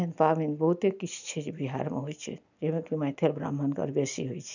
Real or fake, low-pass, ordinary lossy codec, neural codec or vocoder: real; 7.2 kHz; none; none